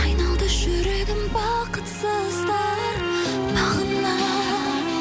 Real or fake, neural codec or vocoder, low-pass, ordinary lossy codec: real; none; none; none